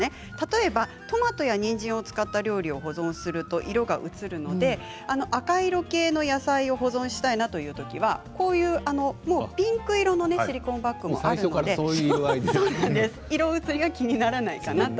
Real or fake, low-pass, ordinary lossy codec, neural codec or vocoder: real; none; none; none